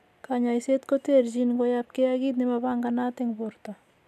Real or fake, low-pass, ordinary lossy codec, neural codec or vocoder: real; 14.4 kHz; none; none